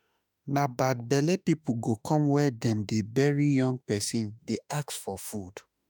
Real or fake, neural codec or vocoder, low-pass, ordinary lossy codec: fake; autoencoder, 48 kHz, 32 numbers a frame, DAC-VAE, trained on Japanese speech; none; none